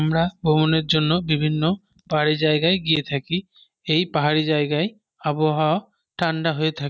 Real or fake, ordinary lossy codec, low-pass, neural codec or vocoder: real; none; none; none